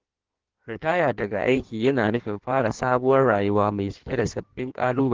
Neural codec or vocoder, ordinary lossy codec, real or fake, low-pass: codec, 16 kHz in and 24 kHz out, 1.1 kbps, FireRedTTS-2 codec; Opus, 16 kbps; fake; 7.2 kHz